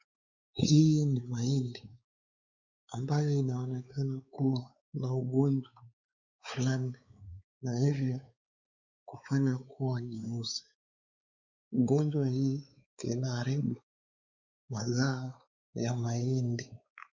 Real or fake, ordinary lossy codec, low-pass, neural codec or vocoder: fake; Opus, 64 kbps; 7.2 kHz; codec, 16 kHz, 4 kbps, X-Codec, WavLM features, trained on Multilingual LibriSpeech